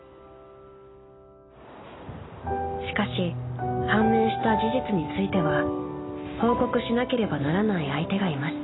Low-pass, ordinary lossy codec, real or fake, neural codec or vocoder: 7.2 kHz; AAC, 16 kbps; real; none